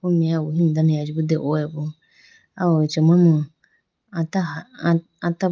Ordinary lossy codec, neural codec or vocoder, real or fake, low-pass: Opus, 32 kbps; none; real; 7.2 kHz